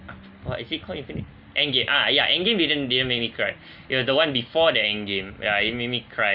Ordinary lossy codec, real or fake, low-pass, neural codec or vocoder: none; real; 5.4 kHz; none